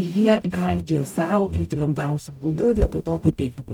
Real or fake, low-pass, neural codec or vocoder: fake; 19.8 kHz; codec, 44.1 kHz, 0.9 kbps, DAC